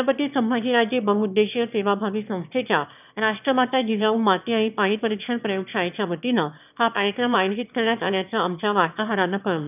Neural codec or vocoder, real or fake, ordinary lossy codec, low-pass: autoencoder, 22.05 kHz, a latent of 192 numbers a frame, VITS, trained on one speaker; fake; none; 3.6 kHz